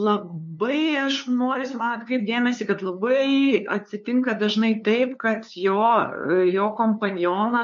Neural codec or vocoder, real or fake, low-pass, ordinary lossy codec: codec, 16 kHz, 2 kbps, FunCodec, trained on LibriTTS, 25 frames a second; fake; 7.2 kHz; MP3, 48 kbps